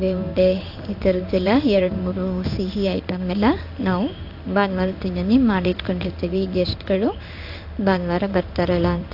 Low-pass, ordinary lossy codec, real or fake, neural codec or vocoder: 5.4 kHz; AAC, 48 kbps; fake; codec, 16 kHz in and 24 kHz out, 1 kbps, XY-Tokenizer